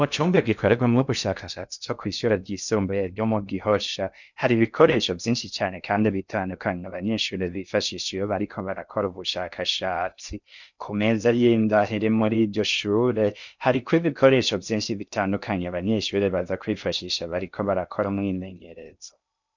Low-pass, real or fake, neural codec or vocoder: 7.2 kHz; fake; codec, 16 kHz in and 24 kHz out, 0.6 kbps, FocalCodec, streaming, 2048 codes